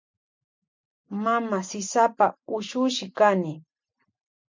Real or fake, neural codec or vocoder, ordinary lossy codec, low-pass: real; none; MP3, 64 kbps; 7.2 kHz